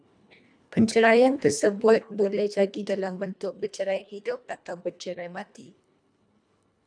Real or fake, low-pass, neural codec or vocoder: fake; 9.9 kHz; codec, 24 kHz, 1.5 kbps, HILCodec